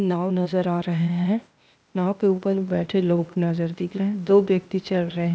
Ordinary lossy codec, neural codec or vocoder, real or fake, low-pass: none; codec, 16 kHz, 0.8 kbps, ZipCodec; fake; none